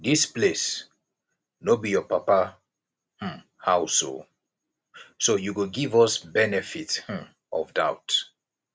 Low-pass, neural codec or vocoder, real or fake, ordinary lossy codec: none; none; real; none